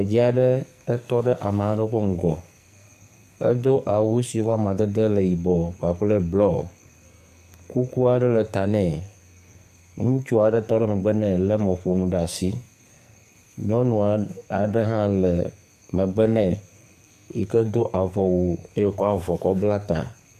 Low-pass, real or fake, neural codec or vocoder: 14.4 kHz; fake; codec, 44.1 kHz, 2.6 kbps, SNAC